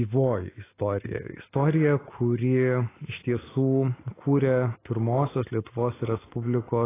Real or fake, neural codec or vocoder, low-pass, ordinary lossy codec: real; none; 3.6 kHz; AAC, 16 kbps